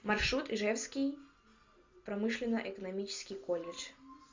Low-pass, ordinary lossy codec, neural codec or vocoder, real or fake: 7.2 kHz; MP3, 64 kbps; none; real